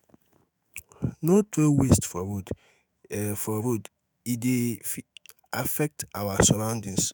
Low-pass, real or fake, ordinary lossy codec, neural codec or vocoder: none; fake; none; autoencoder, 48 kHz, 128 numbers a frame, DAC-VAE, trained on Japanese speech